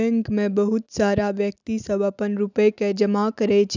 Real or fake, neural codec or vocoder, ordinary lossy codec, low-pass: real; none; none; 7.2 kHz